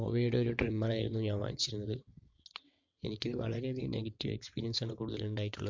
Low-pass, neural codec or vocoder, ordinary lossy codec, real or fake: 7.2 kHz; vocoder, 22.05 kHz, 80 mel bands, WaveNeXt; MP3, 48 kbps; fake